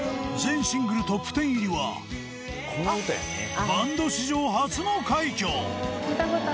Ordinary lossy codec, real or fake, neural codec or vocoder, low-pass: none; real; none; none